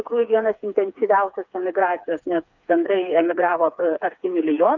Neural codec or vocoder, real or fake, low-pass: codec, 16 kHz, 4 kbps, FreqCodec, smaller model; fake; 7.2 kHz